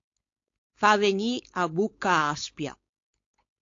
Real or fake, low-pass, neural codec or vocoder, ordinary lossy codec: fake; 7.2 kHz; codec, 16 kHz, 4.8 kbps, FACodec; AAC, 48 kbps